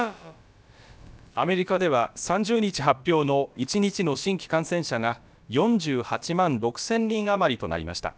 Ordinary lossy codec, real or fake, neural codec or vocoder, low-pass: none; fake; codec, 16 kHz, about 1 kbps, DyCAST, with the encoder's durations; none